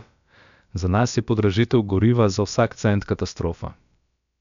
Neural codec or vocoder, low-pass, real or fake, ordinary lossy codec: codec, 16 kHz, about 1 kbps, DyCAST, with the encoder's durations; 7.2 kHz; fake; MP3, 96 kbps